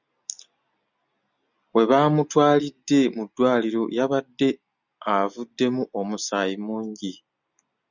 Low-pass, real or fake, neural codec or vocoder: 7.2 kHz; real; none